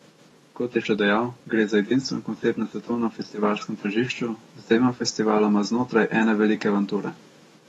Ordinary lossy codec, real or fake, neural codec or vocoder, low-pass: AAC, 32 kbps; fake; autoencoder, 48 kHz, 128 numbers a frame, DAC-VAE, trained on Japanese speech; 19.8 kHz